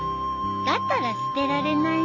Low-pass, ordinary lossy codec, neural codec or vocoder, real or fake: 7.2 kHz; none; none; real